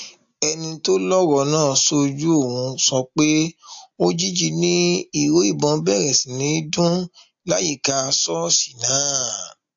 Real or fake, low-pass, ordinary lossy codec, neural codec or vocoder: real; 7.2 kHz; AAC, 64 kbps; none